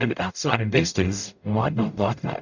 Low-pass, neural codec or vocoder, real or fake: 7.2 kHz; codec, 44.1 kHz, 0.9 kbps, DAC; fake